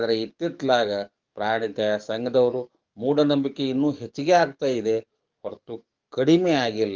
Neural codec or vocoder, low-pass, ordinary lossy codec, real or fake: codec, 44.1 kHz, 7.8 kbps, DAC; 7.2 kHz; Opus, 32 kbps; fake